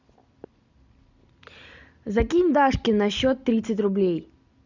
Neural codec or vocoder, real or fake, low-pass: none; real; 7.2 kHz